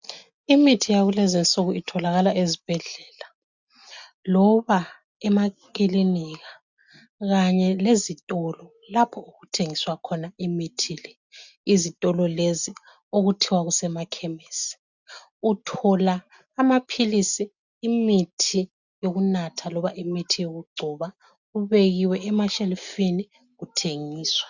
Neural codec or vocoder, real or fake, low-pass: none; real; 7.2 kHz